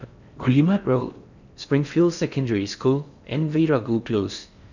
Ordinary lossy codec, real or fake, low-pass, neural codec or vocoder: none; fake; 7.2 kHz; codec, 16 kHz in and 24 kHz out, 0.6 kbps, FocalCodec, streaming, 4096 codes